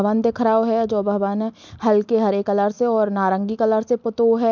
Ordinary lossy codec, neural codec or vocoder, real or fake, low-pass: MP3, 64 kbps; none; real; 7.2 kHz